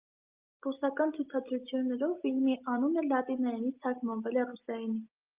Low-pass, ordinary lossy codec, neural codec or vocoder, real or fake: 3.6 kHz; Opus, 32 kbps; none; real